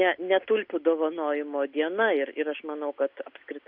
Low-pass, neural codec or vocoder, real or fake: 5.4 kHz; none; real